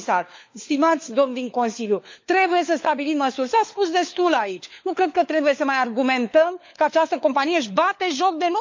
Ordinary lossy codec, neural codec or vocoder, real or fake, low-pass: MP3, 64 kbps; codec, 16 kHz, 4 kbps, FunCodec, trained on LibriTTS, 50 frames a second; fake; 7.2 kHz